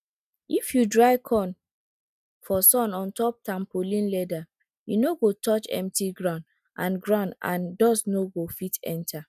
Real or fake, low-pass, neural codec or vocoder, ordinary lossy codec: real; 14.4 kHz; none; none